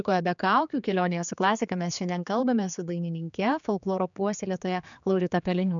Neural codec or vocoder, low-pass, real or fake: codec, 16 kHz, 4 kbps, X-Codec, HuBERT features, trained on general audio; 7.2 kHz; fake